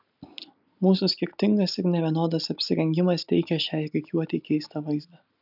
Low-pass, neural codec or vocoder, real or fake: 5.4 kHz; none; real